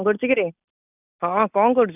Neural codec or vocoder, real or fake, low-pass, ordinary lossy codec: none; real; 3.6 kHz; none